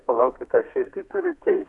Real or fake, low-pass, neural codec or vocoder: fake; 10.8 kHz; codec, 32 kHz, 1.9 kbps, SNAC